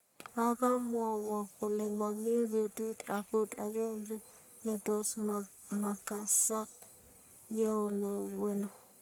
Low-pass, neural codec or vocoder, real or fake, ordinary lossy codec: none; codec, 44.1 kHz, 1.7 kbps, Pupu-Codec; fake; none